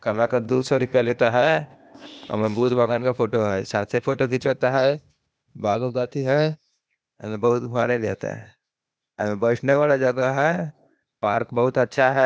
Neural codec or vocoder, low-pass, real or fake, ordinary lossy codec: codec, 16 kHz, 0.8 kbps, ZipCodec; none; fake; none